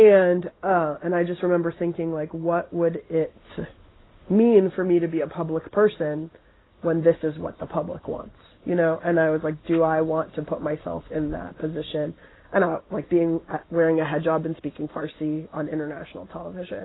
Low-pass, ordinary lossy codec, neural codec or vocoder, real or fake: 7.2 kHz; AAC, 16 kbps; none; real